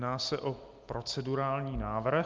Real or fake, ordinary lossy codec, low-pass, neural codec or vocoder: real; Opus, 32 kbps; 7.2 kHz; none